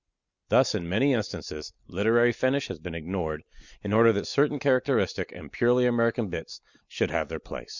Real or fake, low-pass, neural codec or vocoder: real; 7.2 kHz; none